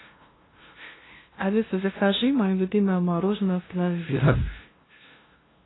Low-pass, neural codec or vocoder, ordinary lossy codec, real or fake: 7.2 kHz; codec, 16 kHz, 0.5 kbps, FunCodec, trained on LibriTTS, 25 frames a second; AAC, 16 kbps; fake